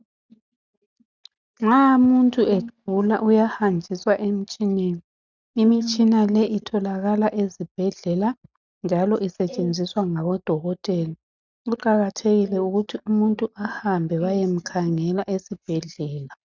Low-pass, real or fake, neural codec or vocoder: 7.2 kHz; real; none